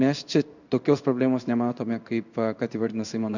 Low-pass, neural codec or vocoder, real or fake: 7.2 kHz; codec, 16 kHz in and 24 kHz out, 1 kbps, XY-Tokenizer; fake